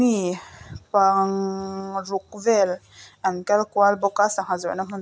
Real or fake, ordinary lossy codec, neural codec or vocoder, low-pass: real; none; none; none